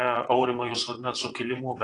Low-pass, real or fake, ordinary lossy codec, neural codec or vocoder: 9.9 kHz; fake; AAC, 32 kbps; vocoder, 22.05 kHz, 80 mel bands, WaveNeXt